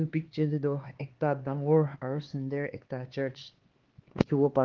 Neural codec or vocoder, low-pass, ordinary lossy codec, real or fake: codec, 16 kHz, 4 kbps, X-Codec, HuBERT features, trained on LibriSpeech; 7.2 kHz; Opus, 16 kbps; fake